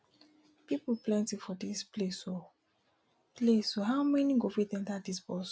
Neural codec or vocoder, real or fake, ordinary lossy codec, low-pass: none; real; none; none